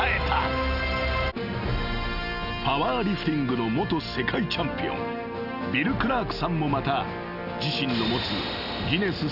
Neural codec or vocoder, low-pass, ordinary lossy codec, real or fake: none; 5.4 kHz; none; real